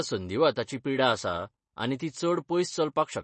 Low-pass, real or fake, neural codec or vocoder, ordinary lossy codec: 10.8 kHz; real; none; MP3, 32 kbps